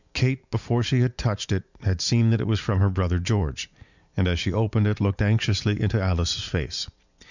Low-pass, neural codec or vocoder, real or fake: 7.2 kHz; vocoder, 44.1 kHz, 80 mel bands, Vocos; fake